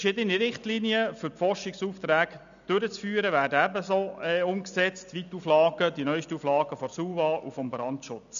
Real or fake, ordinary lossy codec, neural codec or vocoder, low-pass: real; none; none; 7.2 kHz